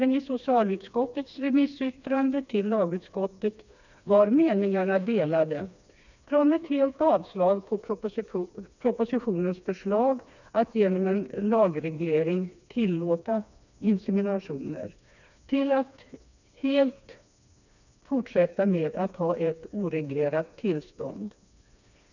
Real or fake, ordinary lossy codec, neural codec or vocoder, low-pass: fake; none; codec, 16 kHz, 2 kbps, FreqCodec, smaller model; 7.2 kHz